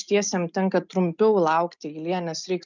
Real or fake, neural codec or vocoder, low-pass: real; none; 7.2 kHz